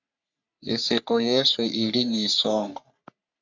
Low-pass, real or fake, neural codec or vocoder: 7.2 kHz; fake; codec, 44.1 kHz, 3.4 kbps, Pupu-Codec